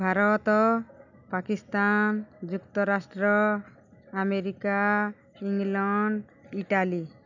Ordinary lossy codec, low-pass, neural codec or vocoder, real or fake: none; 7.2 kHz; none; real